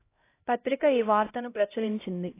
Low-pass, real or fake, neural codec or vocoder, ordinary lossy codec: 3.6 kHz; fake; codec, 16 kHz, 0.5 kbps, X-Codec, HuBERT features, trained on LibriSpeech; AAC, 24 kbps